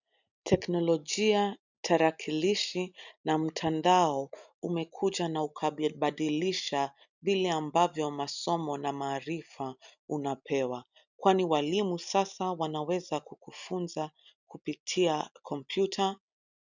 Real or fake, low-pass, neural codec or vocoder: real; 7.2 kHz; none